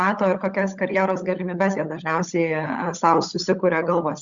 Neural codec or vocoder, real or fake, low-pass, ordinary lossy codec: codec, 16 kHz, 16 kbps, FunCodec, trained on LibriTTS, 50 frames a second; fake; 7.2 kHz; Opus, 64 kbps